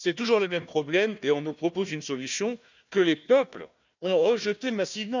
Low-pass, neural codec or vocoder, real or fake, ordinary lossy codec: 7.2 kHz; codec, 16 kHz, 1 kbps, FunCodec, trained on Chinese and English, 50 frames a second; fake; none